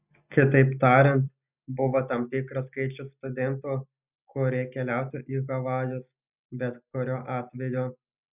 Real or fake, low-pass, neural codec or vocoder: real; 3.6 kHz; none